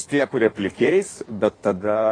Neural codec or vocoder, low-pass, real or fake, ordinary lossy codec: codec, 16 kHz in and 24 kHz out, 1.1 kbps, FireRedTTS-2 codec; 9.9 kHz; fake; AAC, 32 kbps